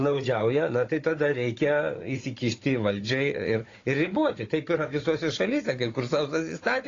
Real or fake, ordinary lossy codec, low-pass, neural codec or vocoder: fake; AAC, 32 kbps; 7.2 kHz; codec, 16 kHz, 16 kbps, FunCodec, trained on Chinese and English, 50 frames a second